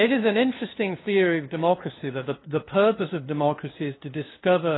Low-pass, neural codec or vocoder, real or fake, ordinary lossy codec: 7.2 kHz; codec, 16 kHz, 2 kbps, FunCodec, trained on LibriTTS, 25 frames a second; fake; AAC, 16 kbps